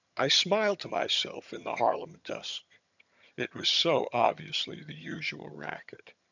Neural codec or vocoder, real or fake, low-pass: vocoder, 22.05 kHz, 80 mel bands, HiFi-GAN; fake; 7.2 kHz